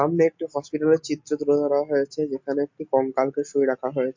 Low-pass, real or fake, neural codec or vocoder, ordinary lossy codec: 7.2 kHz; real; none; MP3, 48 kbps